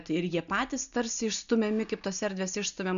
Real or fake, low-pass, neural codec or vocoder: real; 7.2 kHz; none